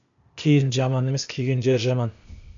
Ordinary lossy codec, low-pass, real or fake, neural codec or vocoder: MP3, 64 kbps; 7.2 kHz; fake; codec, 16 kHz, 0.8 kbps, ZipCodec